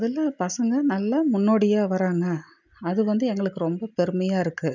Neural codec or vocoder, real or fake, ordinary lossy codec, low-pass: none; real; none; 7.2 kHz